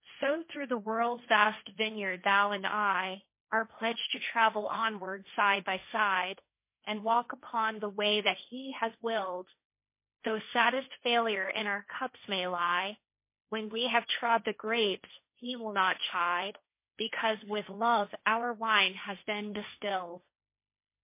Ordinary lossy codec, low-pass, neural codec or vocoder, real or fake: MP3, 24 kbps; 3.6 kHz; codec, 16 kHz, 1.1 kbps, Voila-Tokenizer; fake